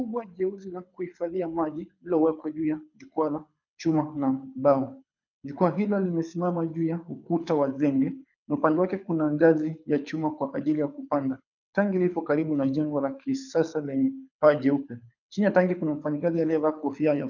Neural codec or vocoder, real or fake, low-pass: codec, 24 kHz, 6 kbps, HILCodec; fake; 7.2 kHz